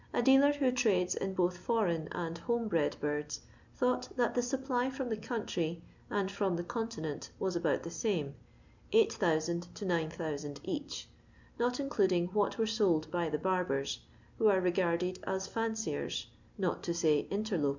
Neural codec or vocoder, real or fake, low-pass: none; real; 7.2 kHz